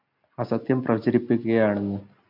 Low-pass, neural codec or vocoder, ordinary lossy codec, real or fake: 5.4 kHz; none; AAC, 48 kbps; real